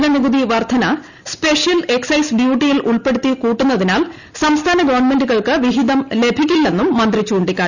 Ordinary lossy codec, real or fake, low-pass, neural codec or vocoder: none; real; 7.2 kHz; none